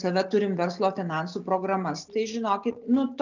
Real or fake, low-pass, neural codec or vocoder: real; 7.2 kHz; none